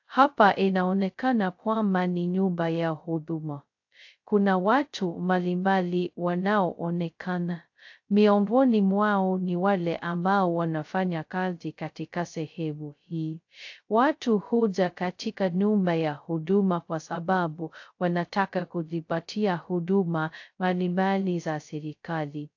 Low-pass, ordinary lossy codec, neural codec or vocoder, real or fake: 7.2 kHz; AAC, 48 kbps; codec, 16 kHz, 0.2 kbps, FocalCodec; fake